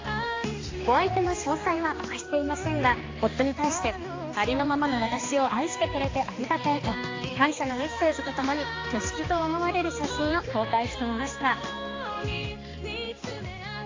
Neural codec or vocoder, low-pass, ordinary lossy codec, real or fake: codec, 16 kHz, 2 kbps, X-Codec, HuBERT features, trained on balanced general audio; 7.2 kHz; AAC, 32 kbps; fake